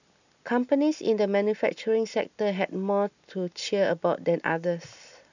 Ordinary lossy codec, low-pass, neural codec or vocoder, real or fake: none; 7.2 kHz; none; real